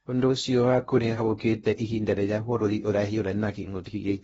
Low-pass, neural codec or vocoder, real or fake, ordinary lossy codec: 10.8 kHz; codec, 16 kHz in and 24 kHz out, 0.6 kbps, FocalCodec, streaming, 2048 codes; fake; AAC, 24 kbps